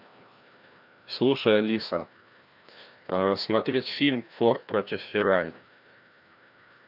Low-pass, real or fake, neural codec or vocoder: 5.4 kHz; fake; codec, 16 kHz, 1 kbps, FreqCodec, larger model